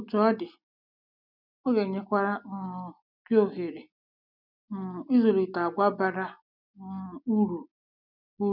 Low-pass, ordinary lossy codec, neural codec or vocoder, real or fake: 5.4 kHz; none; none; real